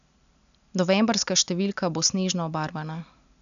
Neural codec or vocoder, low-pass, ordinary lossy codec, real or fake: none; 7.2 kHz; none; real